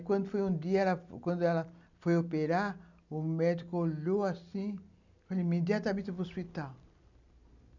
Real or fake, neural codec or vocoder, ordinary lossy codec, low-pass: real; none; none; 7.2 kHz